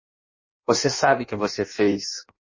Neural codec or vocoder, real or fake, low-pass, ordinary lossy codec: codec, 16 kHz in and 24 kHz out, 0.6 kbps, FireRedTTS-2 codec; fake; 7.2 kHz; MP3, 32 kbps